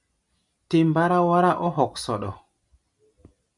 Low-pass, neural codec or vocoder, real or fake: 10.8 kHz; none; real